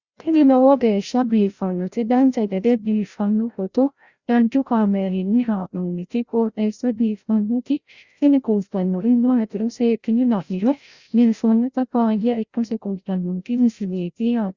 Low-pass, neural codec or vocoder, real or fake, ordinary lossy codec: 7.2 kHz; codec, 16 kHz, 0.5 kbps, FreqCodec, larger model; fake; Opus, 64 kbps